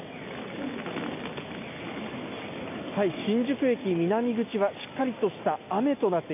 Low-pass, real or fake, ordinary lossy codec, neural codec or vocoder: 3.6 kHz; real; AAC, 32 kbps; none